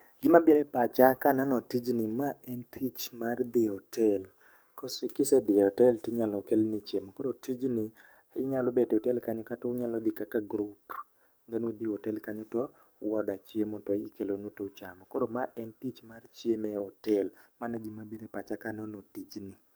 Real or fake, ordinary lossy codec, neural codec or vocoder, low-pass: fake; none; codec, 44.1 kHz, 7.8 kbps, DAC; none